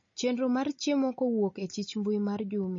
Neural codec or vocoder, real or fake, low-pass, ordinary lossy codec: none; real; 7.2 kHz; MP3, 32 kbps